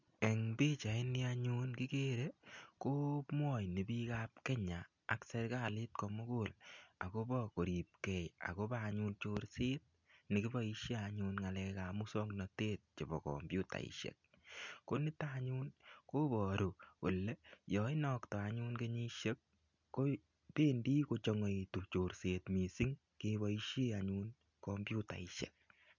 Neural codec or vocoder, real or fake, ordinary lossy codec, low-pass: none; real; none; 7.2 kHz